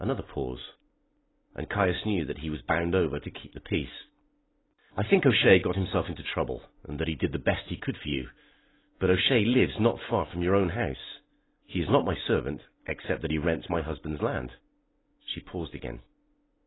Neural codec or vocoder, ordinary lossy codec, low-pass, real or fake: none; AAC, 16 kbps; 7.2 kHz; real